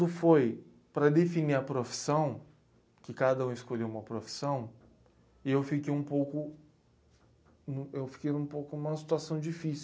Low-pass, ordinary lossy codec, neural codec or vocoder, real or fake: none; none; none; real